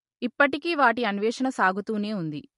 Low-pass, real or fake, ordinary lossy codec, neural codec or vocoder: 14.4 kHz; real; MP3, 48 kbps; none